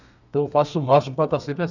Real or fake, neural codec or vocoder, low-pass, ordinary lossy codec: fake; codec, 32 kHz, 1.9 kbps, SNAC; 7.2 kHz; none